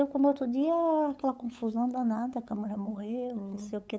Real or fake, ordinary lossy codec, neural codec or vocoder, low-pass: fake; none; codec, 16 kHz, 8 kbps, FunCodec, trained on LibriTTS, 25 frames a second; none